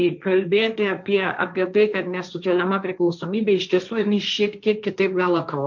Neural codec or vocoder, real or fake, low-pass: codec, 16 kHz, 1.1 kbps, Voila-Tokenizer; fake; 7.2 kHz